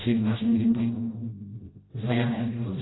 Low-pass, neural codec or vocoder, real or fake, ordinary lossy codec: 7.2 kHz; codec, 16 kHz, 0.5 kbps, FreqCodec, smaller model; fake; AAC, 16 kbps